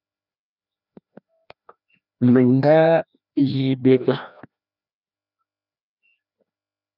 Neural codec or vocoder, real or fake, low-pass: codec, 16 kHz, 1 kbps, FreqCodec, larger model; fake; 5.4 kHz